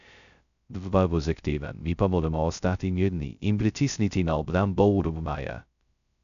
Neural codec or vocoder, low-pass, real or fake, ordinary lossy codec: codec, 16 kHz, 0.2 kbps, FocalCodec; 7.2 kHz; fake; none